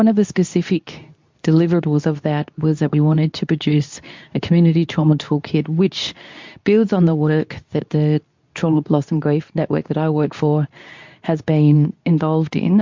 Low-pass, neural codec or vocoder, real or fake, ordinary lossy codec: 7.2 kHz; codec, 24 kHz, 0.9 kbps, WavTokenizer, medium speech release version 2; fake; MP3, 64 kbps